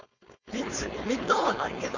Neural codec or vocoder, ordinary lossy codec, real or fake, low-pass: codec, 16 kHz, 4.8 kbps, FACodec; none; fake; 7.2 kHz